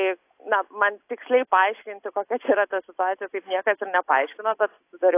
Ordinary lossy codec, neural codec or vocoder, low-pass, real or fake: AAC, 24 kbps; none; 3.6 kHz; real